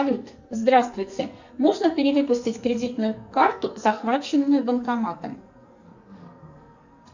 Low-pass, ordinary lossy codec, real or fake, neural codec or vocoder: 7.2 kHz; Opus, 64 kbps; fake; codec, 44.1 kHz, 2.6 kbps, SNAC